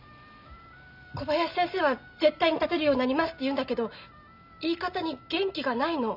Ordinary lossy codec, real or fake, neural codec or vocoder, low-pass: none; real; none; 5.4 kHz